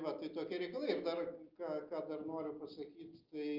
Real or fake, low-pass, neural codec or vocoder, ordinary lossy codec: real; 5.4 kHz; none; Opus, 32 kbps